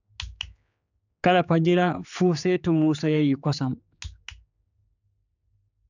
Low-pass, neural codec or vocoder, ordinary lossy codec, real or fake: 7.2 kHz; codec, 16 kHz, 4 kbps, X-Codec, HuBERT features, trained on general audio; none; fake